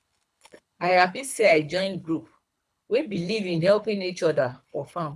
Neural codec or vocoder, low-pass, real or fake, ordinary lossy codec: codec, 24 kHz, 3 kbps, HILCodec; none; fake; none